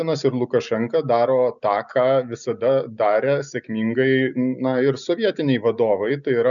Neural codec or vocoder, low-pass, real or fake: none; 7.2 kHz; real